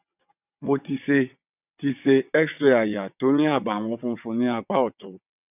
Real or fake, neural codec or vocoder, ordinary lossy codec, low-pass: fake; vocoder, 22.05 kHz, 80 mel bands, WaveNeXt; none; 3.6 kHz